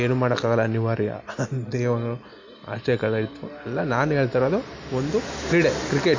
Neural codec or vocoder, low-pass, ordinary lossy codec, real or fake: none; 7.2 kHz; MP3, 64 kbps; real